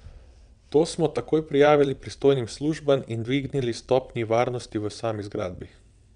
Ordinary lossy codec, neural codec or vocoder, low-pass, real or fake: none; vocoder, 22.05 kHz, 80 mel bands, WaveNeXt; 9.9 kHz; fake